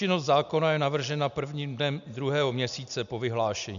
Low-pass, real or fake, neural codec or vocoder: 7.2 kHz; real; none